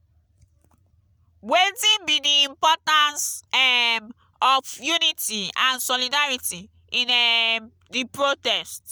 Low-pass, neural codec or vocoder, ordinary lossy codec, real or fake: none; none; none; real